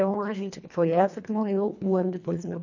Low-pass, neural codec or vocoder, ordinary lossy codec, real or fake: 7.2 kHz; codec, 24 kHz, 1.5 kbps, HILCodec; none; fake